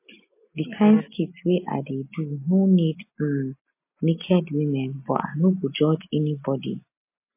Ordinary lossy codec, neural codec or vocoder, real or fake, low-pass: MP3, 24 kbps; none; real; 3.6 kHz